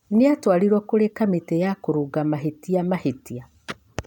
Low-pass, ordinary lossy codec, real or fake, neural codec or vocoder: 19.8 kHz; none; real; none